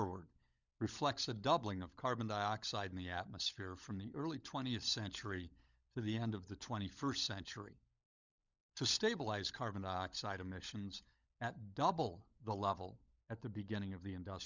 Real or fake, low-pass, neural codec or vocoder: fake; 7.2 kHz; codec, 16 kHz, 16 kbps, FunCodec, trained on LibriTTS, 50 frames a second